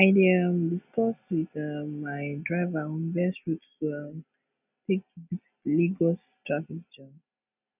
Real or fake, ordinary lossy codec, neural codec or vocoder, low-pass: real; none; none; 3.6 kHz